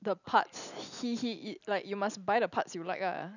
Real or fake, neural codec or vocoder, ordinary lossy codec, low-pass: real; none; none; 7.2 kHz